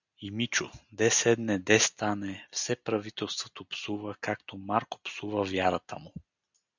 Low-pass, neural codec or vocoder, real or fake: 7.2 kHz; none; real